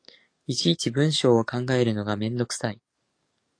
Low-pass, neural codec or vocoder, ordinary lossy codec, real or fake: 9.9 kHz; codec, 44.1 kHz, 7.8 kbps, DAC; AAC, 48 kbps; fake